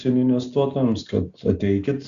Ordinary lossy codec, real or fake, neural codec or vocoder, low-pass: Opus, 64 kbps; real; none; 7.2 kHz